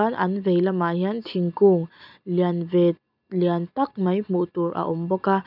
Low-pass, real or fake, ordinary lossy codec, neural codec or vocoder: 5.4 kHz; real; none; none